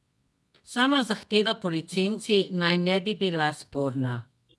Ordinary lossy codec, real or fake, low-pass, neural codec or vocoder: none; fake; none; codec, 24 kHz, 0.9 kbps, WavTokenizer, medium music audio release